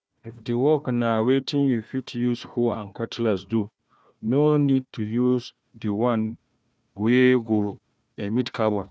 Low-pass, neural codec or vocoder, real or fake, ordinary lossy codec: none; codec, 16 kHz, 1 kbps, FunCodec, trained on Chinese and English, 50 frames a second; fake; none